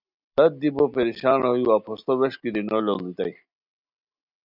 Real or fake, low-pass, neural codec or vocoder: real; 5.4 kHz; none